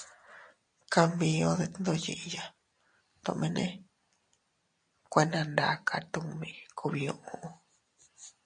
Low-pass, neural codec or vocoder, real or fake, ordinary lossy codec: 9.9 kHz; none; real; MP3, 48 kbps